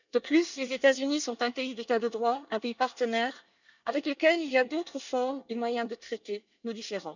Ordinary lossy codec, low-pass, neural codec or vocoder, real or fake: none; 7.2 kHz; codec, 24 kHz, 1 kbps, SNAC; fake